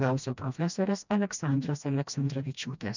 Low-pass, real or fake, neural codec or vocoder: 7.2 kHz; fake; codec, 16 kHz, 1 kbps, FreqCodec, smaller model